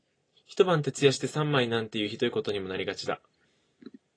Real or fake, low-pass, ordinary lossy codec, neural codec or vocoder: real; 9.9 kHz; AAC, 32 kbps; none